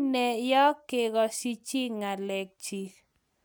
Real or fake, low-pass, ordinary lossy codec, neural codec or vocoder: real; none; none; none